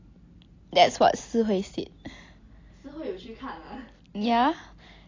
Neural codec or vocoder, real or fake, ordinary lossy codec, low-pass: none; real; AAC, 32 kbps; 7.2 kHz